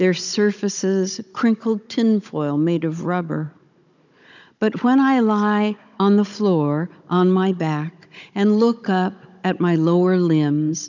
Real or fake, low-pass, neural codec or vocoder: fake; 7.2 kHz; codec, 16 kHz, 16 kbps, FunCodec, trained on Chinese and English, 50 frames a second